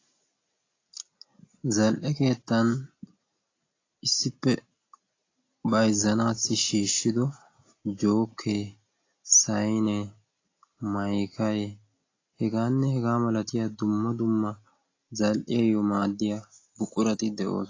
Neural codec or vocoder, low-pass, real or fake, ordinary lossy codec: none; 7.2 kHz; real; AAC, 32 kbps